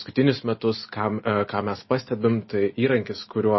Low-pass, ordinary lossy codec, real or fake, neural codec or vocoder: 7.2 kHz; MP3, 24 kbps; real; none